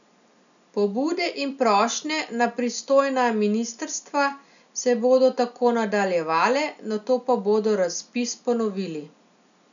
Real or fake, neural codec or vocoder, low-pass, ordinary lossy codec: real; none; 7.2 kHz; none